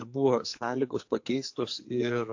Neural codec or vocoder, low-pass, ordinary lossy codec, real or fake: codec, 44.1 kHz, 2.6 kbps, SNAC; 7.2 kHz; AAC, 48 kbps; fake